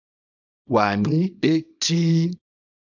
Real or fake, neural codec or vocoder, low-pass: fake; codec, 24 kHz, 0.9 kbps, WavTokenizer, small release; 7.2 kHz